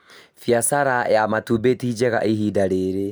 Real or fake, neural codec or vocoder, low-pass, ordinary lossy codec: real; none; none; none